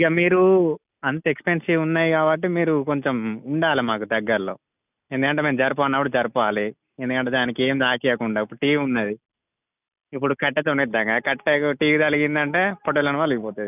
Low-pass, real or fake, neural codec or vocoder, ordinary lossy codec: 3.6 kHz; real; none; none